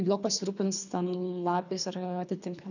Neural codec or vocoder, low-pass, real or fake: codec, 24 kHz, 3 kbps, HILCodec; 7.2 kHz; fake